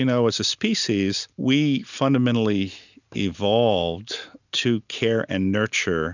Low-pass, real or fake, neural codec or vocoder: 7.2 kHz; real; none